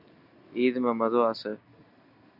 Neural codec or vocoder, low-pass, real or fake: none; 5.4 kHz; real